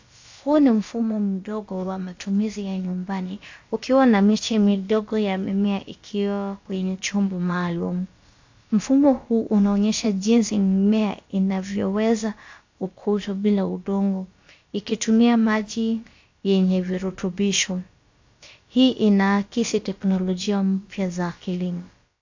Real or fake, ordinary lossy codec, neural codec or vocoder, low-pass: fake; AAC, 48 kbps; codec, 16 kHz, about 1 kbps, DyCAST, with the encoder's durations; 7.2 kHz